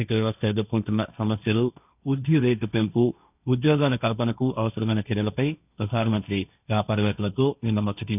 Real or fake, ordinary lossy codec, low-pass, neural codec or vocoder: fake; none; 3.6 kHz; codec, 16 kHz, 1.1 kbps, Voila-Tokenizer